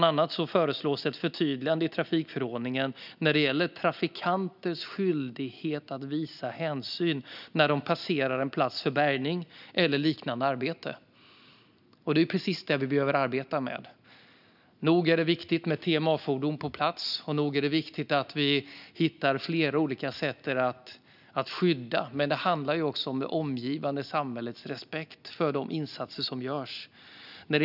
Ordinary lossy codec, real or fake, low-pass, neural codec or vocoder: none; real; 5.4 kHz; none